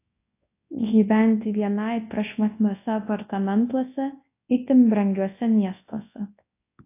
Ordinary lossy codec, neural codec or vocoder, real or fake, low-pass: AAC, 24 kbps; codec, 24 kHz, 0.9 kbps, WavTokenizer, large speech release; fake; 3.6 kHz